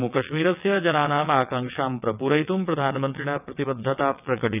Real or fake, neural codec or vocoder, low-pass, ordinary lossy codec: fake; vocoder, 22.05 kHz, 80 mel bands, WaveNeXt; 3.6 kHz; none